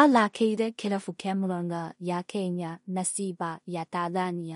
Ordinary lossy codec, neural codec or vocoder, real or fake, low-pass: MP3, 48 kbps; codec, 16 kHz in and 24 kHz out, 0.4 kbps, LongCat-Audio-Codec, two codebook decoder; fake; 10.8 kHz